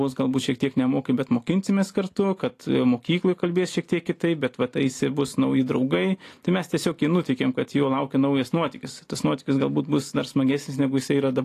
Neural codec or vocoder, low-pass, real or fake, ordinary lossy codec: none; 14.4 kHz; real; AAC, 48 kbps